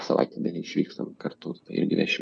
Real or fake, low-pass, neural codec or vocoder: real; 9.9 kHz; none